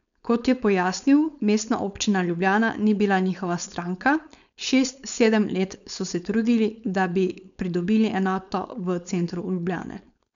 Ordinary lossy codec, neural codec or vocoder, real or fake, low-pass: none; codec, 16 kHz, 4.8 kbps, FACodec; fake; 7.2 kHz